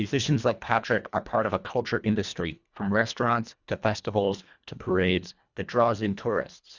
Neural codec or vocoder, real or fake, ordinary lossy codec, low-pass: codec, 24 kHz, 1.5 kbps, HILCodec; fake; Opus, 64 kbps; 7.2 kHz